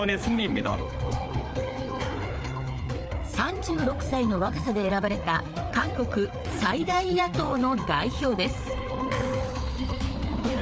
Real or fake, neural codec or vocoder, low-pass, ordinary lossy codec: fake; codec, 16 kHz, 4 kbps, FreqCodec, larger model; none; none